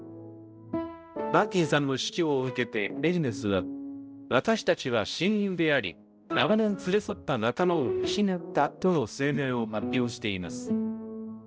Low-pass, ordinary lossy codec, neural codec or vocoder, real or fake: none; none; codec, 16 kHz, 0.5 kbps, X-Codec, HuBERT features, trained on balanced general audio; fake